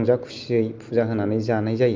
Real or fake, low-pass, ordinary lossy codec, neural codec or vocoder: real; 7.2 kHz; Opus, 24 kbps; none